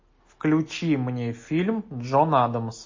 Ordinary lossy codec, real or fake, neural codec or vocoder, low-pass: MP3, 32 kbps; real; none; 7.2 kHz